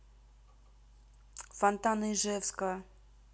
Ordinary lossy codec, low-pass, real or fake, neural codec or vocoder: none; none; real; none